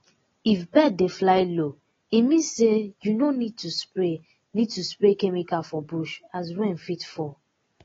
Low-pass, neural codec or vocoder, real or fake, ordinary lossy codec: 7.2 kHz; none; real; AAC, 24 kbps